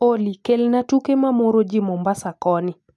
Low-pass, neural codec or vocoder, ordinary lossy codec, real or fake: none; none; none; real